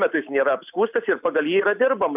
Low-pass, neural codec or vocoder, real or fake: 3.6 kHz; none; real